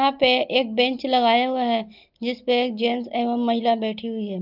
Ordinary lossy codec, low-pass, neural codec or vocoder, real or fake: Opus, 24 kbps; 5.4 kHz; none; real